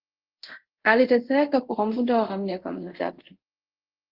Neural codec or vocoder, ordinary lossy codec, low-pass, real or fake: codec, 24 kHz, 0.5 kbps, DualCodec; Opus, 16 kbps; 5.4 kHz; fake